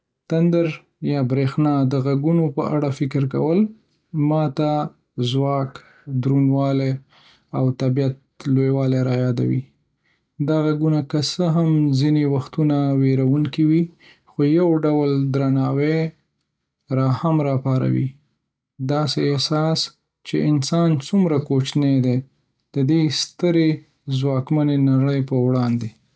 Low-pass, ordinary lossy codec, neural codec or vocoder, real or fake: none; none; none; real